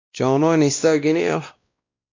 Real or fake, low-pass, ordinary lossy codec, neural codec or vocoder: fake; 7.2 kHz; MP3, 64 kbps; codec, 16 kHz, 1 kbps, X-Codec, WavLM features, trained on Multilingual LibriSpeech